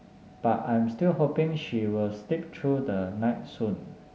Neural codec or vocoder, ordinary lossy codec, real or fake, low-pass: none; none; real; none